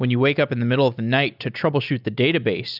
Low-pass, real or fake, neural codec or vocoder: 5.4 kHz; real; none